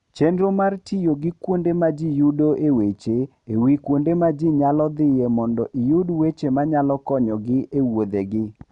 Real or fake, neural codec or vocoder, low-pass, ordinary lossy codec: real; none; 10.8 kHz; none